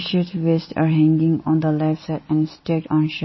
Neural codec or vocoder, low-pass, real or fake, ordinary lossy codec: none; 7.2 kHz; real; MP3, 24 kbps